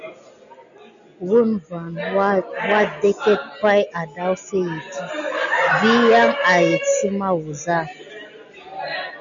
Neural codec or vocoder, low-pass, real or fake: none; 7.2 kHz; real